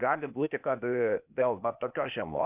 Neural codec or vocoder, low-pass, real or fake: codec, 16 kHz, 0.8 kbps, ZipCodec; 3.6 kHz; fake